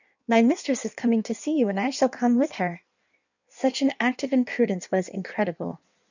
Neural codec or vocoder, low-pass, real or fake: codec, 16 kHz in and 24 kHz out, 1.1 kbps, FireRedTTS-2 codec; 7.2 kHz; fake